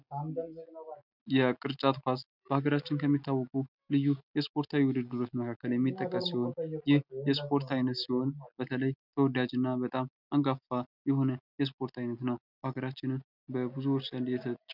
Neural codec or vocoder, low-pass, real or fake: none; 5.4 kHz; real